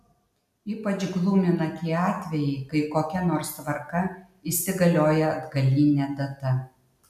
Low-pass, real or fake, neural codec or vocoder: 14.4 kHz; real; none